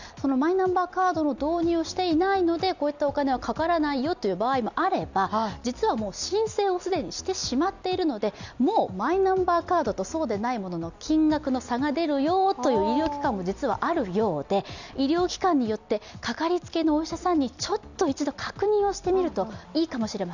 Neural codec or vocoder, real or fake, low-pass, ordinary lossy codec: none; real; 7.2 kHz; none